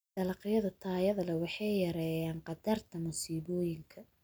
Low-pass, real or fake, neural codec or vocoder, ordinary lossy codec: none; real; none; none